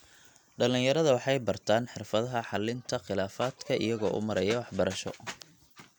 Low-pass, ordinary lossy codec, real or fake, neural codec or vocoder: 19.8 kHz; none; real; none